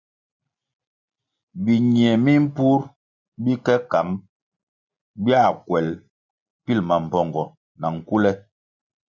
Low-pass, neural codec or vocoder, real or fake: 7.2 kHz; none; real